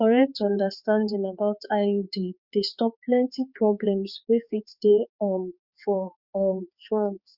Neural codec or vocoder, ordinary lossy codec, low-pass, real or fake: codec, 16 kHz, 4 kbps, X-Codec, HuBERT features, trained on balanced general audio; Opus, 64 kbps; 5.4 kHz; fake